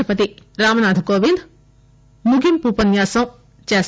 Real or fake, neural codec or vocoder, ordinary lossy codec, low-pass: real; none; none; none